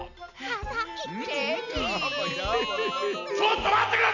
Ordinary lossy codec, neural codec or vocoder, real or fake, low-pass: none; none; real; 7.2 kHz